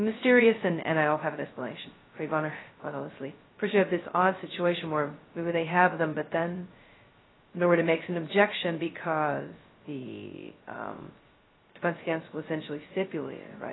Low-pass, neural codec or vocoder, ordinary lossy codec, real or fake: 7.2 kHz; codec, 16 kHz, 0.2 kbps, FocalCodec; AAC, 16 kbps; fake